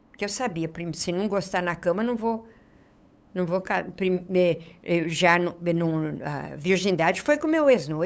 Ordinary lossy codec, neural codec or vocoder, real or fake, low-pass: none; codec, 16 kHz, 8 kbps, FunCodec, trained on LibriTTS, 25 frames a second; fake; none